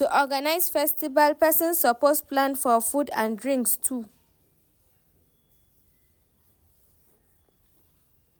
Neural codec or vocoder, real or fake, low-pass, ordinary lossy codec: none; real; none; none